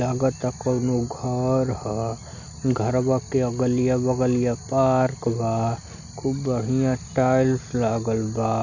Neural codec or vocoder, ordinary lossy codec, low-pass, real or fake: none; none; 7.2 kHz; real